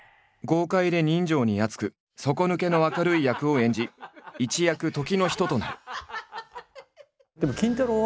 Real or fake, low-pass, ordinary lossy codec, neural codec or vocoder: real; none; none; none